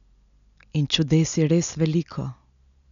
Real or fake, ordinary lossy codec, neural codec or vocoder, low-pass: real; none; none; 7.2 kHz